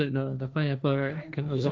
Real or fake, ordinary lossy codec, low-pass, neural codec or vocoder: fake; none; none; codec, 16 kHz, 1.1 kbps, Voila-Tokenizer